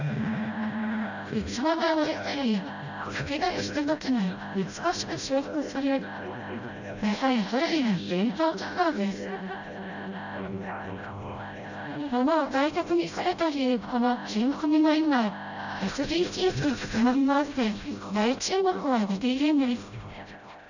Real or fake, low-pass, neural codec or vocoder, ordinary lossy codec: fake; 7.2 kHz; codec, 16 kHz, 0.5 kbps, FreqCodec, smaller model; none